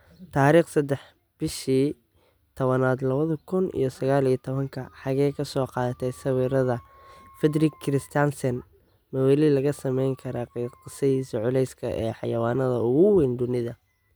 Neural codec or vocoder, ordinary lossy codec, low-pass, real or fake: none; none; none; real